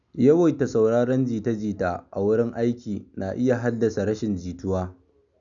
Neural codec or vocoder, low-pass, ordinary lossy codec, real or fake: none; 7.2 kHz; none; real